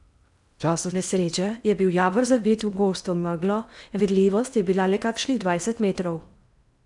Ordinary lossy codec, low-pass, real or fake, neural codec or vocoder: none; 10.8 kHz; fake; codec, 16 kHz in and 24 kHz out, 0.8 kbps, FocalCodec, streaming, 65536 codes